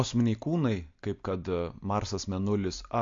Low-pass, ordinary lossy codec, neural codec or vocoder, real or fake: 7.2 kHz; MP3, 48 kbps; none; real